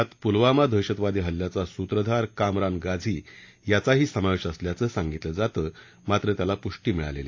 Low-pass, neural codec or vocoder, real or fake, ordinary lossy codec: 7.2 kHz; none; real; AAC, 48 kbps